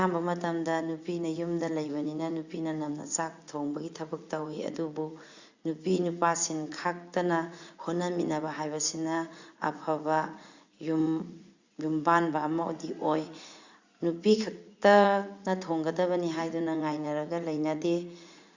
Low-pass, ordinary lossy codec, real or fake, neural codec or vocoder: 7.2 kHz; Opus, 64 kbps; fake; vocoder, 44.1 kHz, 80 mel bands, Vocos